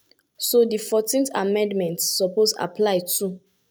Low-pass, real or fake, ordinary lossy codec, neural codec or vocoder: none; real; none; none